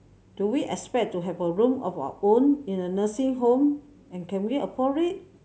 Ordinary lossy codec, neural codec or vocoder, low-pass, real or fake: none; none; none; real